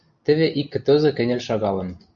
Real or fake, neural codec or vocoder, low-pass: real; none; 7.2 kHz